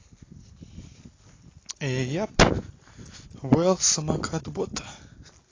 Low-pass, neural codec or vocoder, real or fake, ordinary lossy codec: 7.2 kHz; none; real; AAC, 32 kbps